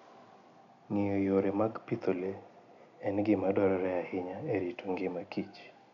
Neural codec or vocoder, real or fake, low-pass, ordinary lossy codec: none; real; 7.2 kHz; none